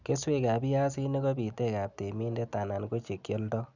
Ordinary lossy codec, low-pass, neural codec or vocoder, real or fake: none; 7.2 kHz; none; real